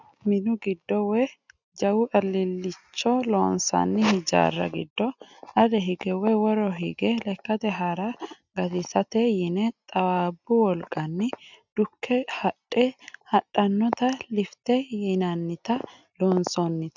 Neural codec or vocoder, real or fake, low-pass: none; real; 7.2 kHz